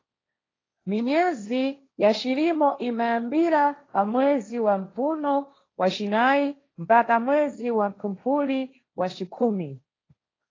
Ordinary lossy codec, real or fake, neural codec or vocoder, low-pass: AAC, 32 kbps; fake; codec, 16 kHz, 1.1 kbps, Voila-Tokenizer; 7.2 kHz